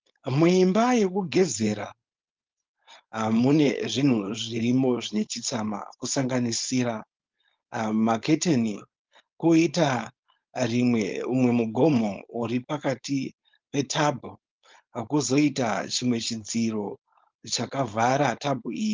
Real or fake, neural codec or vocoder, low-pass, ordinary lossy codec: fake; codec, 16 kHz, 4.8 kbps, FACodec; 7.2 kHz; Opus, 32 kbps